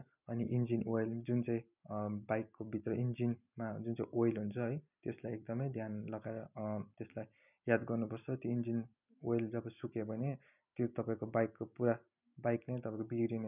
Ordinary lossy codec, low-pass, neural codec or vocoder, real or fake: none; 3.6 kHz; none; real